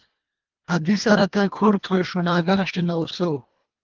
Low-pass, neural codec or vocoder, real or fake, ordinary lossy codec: 7.2 kHz; codec, 24 kHz, 1.5 kbps, HILCodec; fake; Opus, 32 kbps